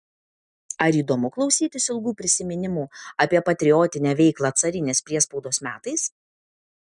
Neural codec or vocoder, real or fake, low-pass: none; real; 10.8 kHz